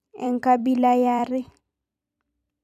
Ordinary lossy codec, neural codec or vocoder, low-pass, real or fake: none; vocoder, 44.1 kHz, 128 mel bands every 256 samples, BigVGAN v2; 14.4 kHz; fake